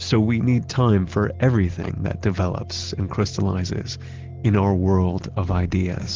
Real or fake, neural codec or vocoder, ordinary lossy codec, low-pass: real; none; Opus, 16 kbps; 7.2 kHz